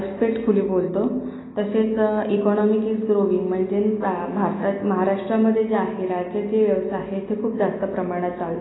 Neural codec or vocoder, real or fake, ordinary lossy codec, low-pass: none; real; AAC, 16 kbps; 7.2 kHz